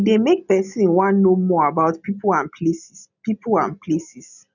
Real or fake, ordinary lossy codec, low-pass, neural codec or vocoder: real; none; 7.2 kHz; none